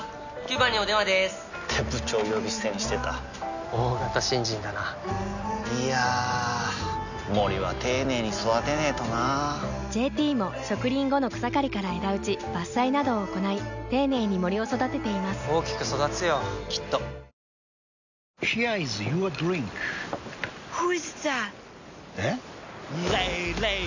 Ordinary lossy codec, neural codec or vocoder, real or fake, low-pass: none; none; real; 7.2 kHz